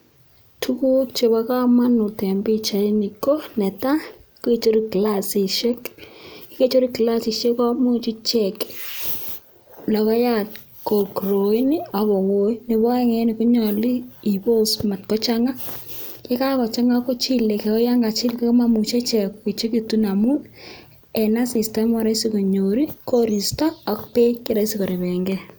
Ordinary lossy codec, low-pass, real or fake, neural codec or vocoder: none; none; real; none